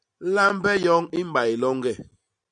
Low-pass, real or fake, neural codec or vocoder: 9.9 kHz; real; none